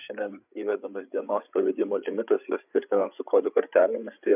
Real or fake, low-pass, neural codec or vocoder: fake; 3.6 kHz; codec, 16 kHz, 8 kbps, FreqCodec, larger model